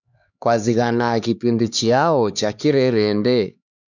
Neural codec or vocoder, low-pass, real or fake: codec, 16 kHz, 2 kbps, X-Codec, HuBERT features, trained on LibriSpeech; 7.2 kHz; fake